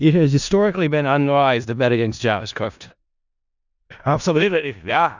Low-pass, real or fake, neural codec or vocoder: 7.2 kHz; fake; codec, 16 kHz in and 24 kHz out, 0.4 kbps, LongCat-Audio-Codec, four codebook decoder